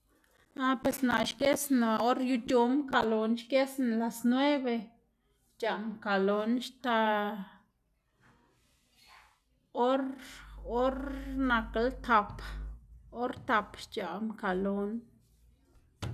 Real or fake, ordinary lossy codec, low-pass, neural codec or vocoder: real; none; 14.4 kHz; none